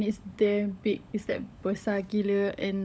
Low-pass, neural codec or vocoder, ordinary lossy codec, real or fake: none; codec, 16 kHz, 16 kbps, FunCodec, trained on LibriTTS, 50 frames a second; none; fake